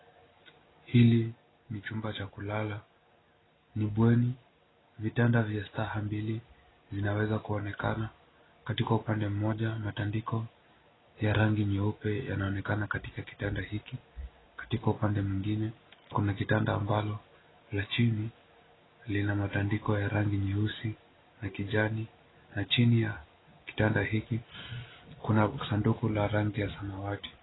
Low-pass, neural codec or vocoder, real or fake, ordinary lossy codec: 7.2 kHz; none; real; AAC, 16 kbps